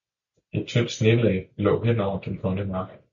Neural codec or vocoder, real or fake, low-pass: none; real; 7.2 kHz